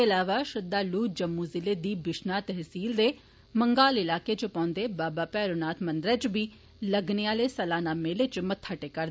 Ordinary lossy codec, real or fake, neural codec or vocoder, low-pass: none; real; none; none